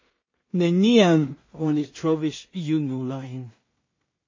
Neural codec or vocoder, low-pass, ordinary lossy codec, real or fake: codec, 16 kHz in and 24 kHz out, 0.4 kbps, LongCat-Audio-Codec, two codebook decoder; 7.2 kHz; MP3, 32 kbps; fake